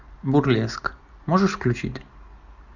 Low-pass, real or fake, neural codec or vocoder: 7.2 kHz; real; none